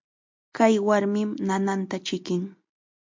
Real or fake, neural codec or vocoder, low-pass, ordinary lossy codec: real; none; 7.2 kHz; MP3, 48 kbps